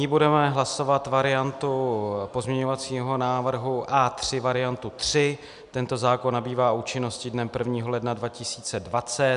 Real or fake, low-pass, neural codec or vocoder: real; 10.8 kHz; none